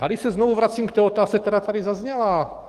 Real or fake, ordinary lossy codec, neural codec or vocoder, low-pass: fake; Opus, 24 kbps; codec, 44.1 kHz, 7.8 kbps, DAC; 14.4 kHz